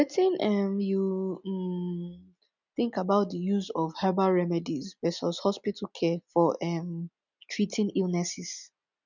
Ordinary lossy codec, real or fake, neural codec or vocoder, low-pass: none; real; none; 7.2 kHz